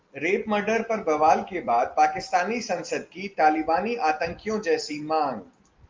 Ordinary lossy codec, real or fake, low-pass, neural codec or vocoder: Opus, 32 kbps; real; 7.2 kHz; none